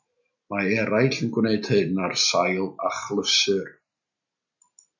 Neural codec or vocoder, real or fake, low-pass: none; real; 7.2 kHz